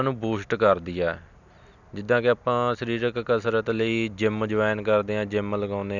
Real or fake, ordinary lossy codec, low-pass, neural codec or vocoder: real; none; 7.2 kHz; none